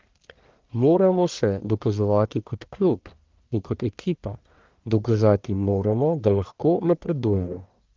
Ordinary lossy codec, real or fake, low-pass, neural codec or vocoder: Opus, 32 kbps; fake; 7.2 kHz; codec, 44.1 kHz, 1.7 kbps, Pupu-Codec